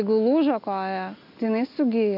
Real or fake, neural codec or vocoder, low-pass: real; none; 5.4 kHz